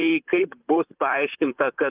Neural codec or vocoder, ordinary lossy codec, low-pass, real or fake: vocoder, 44.1 kHz, 128 mel bands, Pupu-Vocoder; Opus, 24 kbps; 3.6 kHz; fake